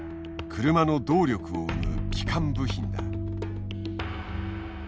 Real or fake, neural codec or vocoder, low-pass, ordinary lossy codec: real; none; none; none